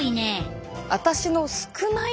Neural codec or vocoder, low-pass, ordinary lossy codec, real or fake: none; none; none; real